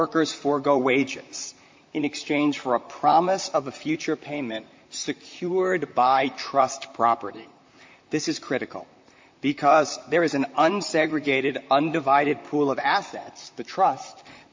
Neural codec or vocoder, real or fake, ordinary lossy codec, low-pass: vocoder, 44.1 kHz, 128 mel bands, Pupu-Vocoder; fake; MP3, 48 kbps; 7.2 kHz